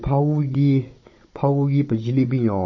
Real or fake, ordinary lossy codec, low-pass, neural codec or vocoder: fake; MP3, 32 kbps; 7.2 kHz; codec, 16 kHz, 16 kbps, FunCodec, trained on Chinese and English, 50 frames a second